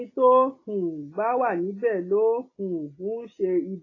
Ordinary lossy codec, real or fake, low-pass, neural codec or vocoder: AAC, 32 kbps; real; 7.2 kHz; none